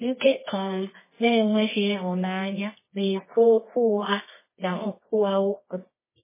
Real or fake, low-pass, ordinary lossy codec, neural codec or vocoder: fake; 3.6 kHz; MP3, 16 kbps; codec, 24 kHz, 0.9 kbps, WavTokenizer, medium music audio release